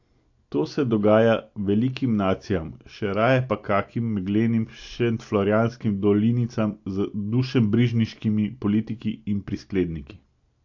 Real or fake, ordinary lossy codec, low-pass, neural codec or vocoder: real; AAC, 48 kbps; 7.2 kHz; none